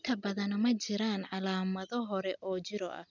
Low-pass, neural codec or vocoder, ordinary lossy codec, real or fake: 7.2 kHz; none; none; real